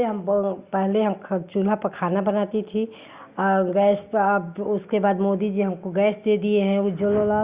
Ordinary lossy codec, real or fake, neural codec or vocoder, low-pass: Opus, 64 kbps; real; none; 3.6 kHz